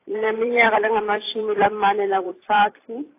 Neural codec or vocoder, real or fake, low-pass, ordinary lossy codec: vocoder, 44.1 kHz, 128 mel bands every 256 samples, BigVGAN v2; fake; 3.6 kHz; AAC, 24 kbps